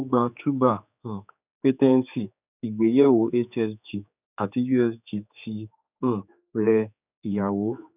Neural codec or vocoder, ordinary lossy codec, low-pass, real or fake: codec, 16 kHz, 4 kbps, X-Codec, HuBERT features, trained on general audio; none; 3.6 kHz; fake